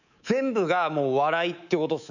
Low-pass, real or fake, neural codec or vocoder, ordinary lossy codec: 7.2 kHz; fake; codec, 24 kHz, 3.1 kbps, DualCodec; none